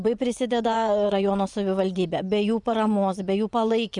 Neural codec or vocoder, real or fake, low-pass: vocoder, 24 kHz, 100 mel bands, Vocos; fake; 10.8 kHz